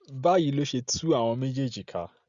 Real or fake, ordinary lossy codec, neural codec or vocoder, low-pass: real; Opus, 64 kbps; none; 7.2 kHz